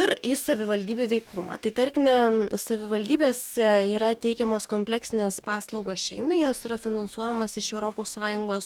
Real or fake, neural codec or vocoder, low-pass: fake; codec, 44.1 kHz, 2.6 kbps, DAC; 19.8 kHz